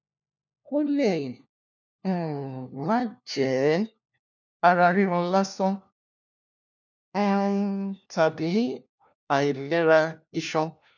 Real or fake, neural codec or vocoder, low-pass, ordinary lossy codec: fake; codec, 16 kHz, 1 kbps, FunCodec, trained on LibriTTS, 50 frames a second; 7.2 kHz; none